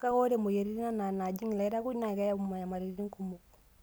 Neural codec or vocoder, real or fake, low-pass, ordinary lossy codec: none; real; none; none